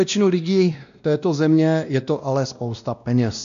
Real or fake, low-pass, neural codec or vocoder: fake; 7.2 kHz; codec, 16 kHz, 1 kbps, X-Codec, WavLM features, trained on Multilingual LibriSpeech